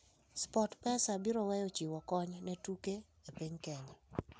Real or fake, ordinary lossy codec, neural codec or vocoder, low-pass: real; none; none; none